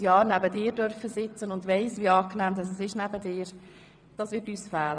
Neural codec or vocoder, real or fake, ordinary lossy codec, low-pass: vocoder, 22.05 kHz, 80 mel bands, WaveNeXt; fake; none; 9.9 kHz